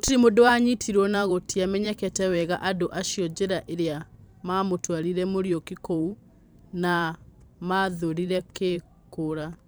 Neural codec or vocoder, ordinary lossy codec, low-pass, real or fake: vocoder, 44.1 kHz, 128 mel bands every 512 samples, BigVGAN v2; none; none; fake